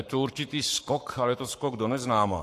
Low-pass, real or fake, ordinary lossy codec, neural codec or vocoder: 14.4 kHz; fake; AAC, 64 kbps; codec, 44.1 kHz, 7.8 kbps, Pupu-Codec